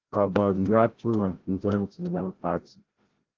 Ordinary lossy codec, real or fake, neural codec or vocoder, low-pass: Opus, 16 kbps; fake; codec, 16 kHz, 0.5 kbps, FreqCodec, larger model; 7.2 kHz